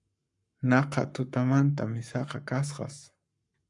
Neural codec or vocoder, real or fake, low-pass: codec, 44.1 kHz, 7.8 kbps, Pupu-Codec; fake; 10.8 kHz